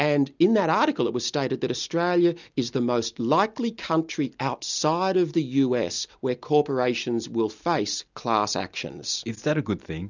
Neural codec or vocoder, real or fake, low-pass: none; real; 7.2 kHz